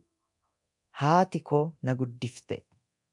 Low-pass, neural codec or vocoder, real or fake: 10.8 kHz; codec, 24 kHz, 0.9 kbps, DualCodec; fake